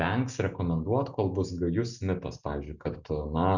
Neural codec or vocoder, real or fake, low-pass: none; real; 7.2 kHz